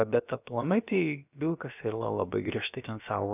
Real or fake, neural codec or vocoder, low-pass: fake; codec, 16 kHz, about 1 kbps, DyCAST, with the encoder's durations; 3.6 kHz